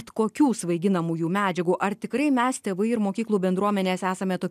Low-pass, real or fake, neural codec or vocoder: 14.4 kHz; real; none